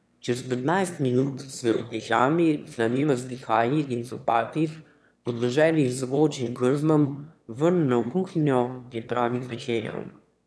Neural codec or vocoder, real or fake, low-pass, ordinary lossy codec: autoencoder, 22.05 kHz, a latent of 192 numbers a frame, VITS, trained on one speaker; fake; none; none